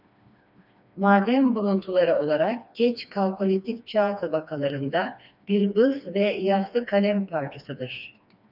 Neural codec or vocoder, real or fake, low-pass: codec, 16 kHz, 2 kbps, FreqCodec, smaller model; fake; 5.4 kHz